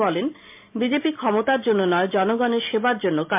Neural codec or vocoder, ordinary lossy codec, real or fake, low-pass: none; MP3, 32 kbps; real; 3.6 kHz